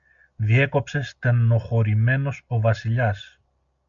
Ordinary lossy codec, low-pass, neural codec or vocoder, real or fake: MP3, 64 kbps; 7.2 kHz; none; real